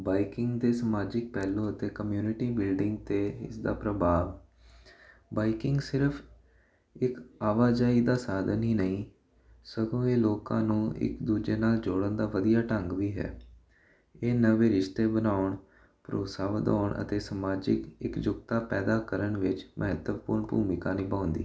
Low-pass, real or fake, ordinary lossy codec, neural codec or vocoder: none; real; none; none